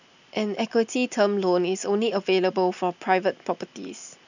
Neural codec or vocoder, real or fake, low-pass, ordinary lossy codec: none; real; 7.2 kHz; none